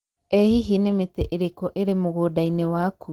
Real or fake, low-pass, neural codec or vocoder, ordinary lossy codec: real; 19.8 kHz; none; Opus, 16 kbps